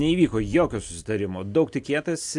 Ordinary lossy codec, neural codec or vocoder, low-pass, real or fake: AAC, 64 kbps; none; 10.8 kHz; real